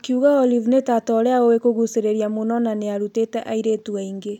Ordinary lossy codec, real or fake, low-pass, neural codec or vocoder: none; real; 19.8 kHz; none